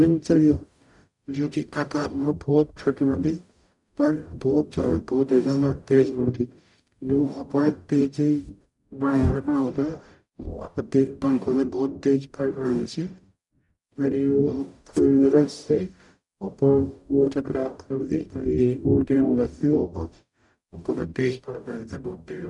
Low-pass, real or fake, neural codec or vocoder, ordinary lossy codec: 10.8 kHz; fake; codec, 44.1 kHz, 0.9 kbps, DAC; none